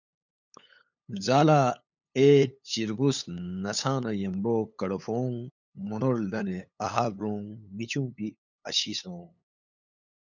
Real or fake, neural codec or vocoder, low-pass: fake; codec, 16 kHz, 8 kbps, FunCodec, trained on LibriTTS, 25 frames a second; 7.2 kHz